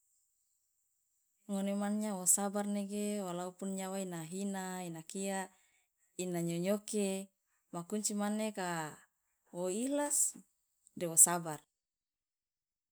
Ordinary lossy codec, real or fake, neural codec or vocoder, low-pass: none; real; none; none